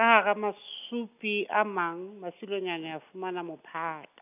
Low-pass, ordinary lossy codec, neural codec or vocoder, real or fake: 3.6 kHz; none; none; real